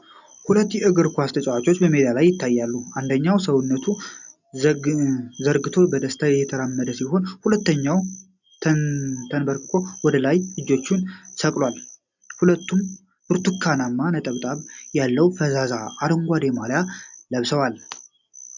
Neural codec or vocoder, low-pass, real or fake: none; 7.2 kHz; real